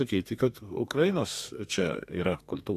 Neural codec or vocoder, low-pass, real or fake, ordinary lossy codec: codec, 32 kHz, 1.9 kbps, SNAC; 14.4 kHz; fake; AAC, 64 kbps